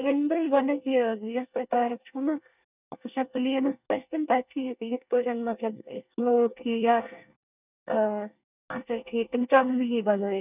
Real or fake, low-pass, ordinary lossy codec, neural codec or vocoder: fake; 3.6 kHz; none; codec, 24 kHz, 1 kbps, SNAC